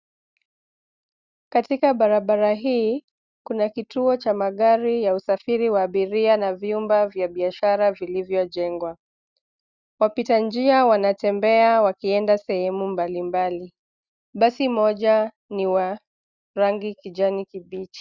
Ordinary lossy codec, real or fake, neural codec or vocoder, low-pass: Opus, 64 kbps; real; none; 7.2 kHz